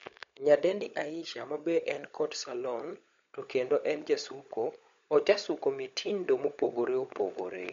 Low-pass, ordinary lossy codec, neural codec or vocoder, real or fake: 7.2 kHz; MP3, 48 kbps; codec, 16 kHz, 16 kbps, FunCodec, trained on LibriTTS, 50 frames a second; fake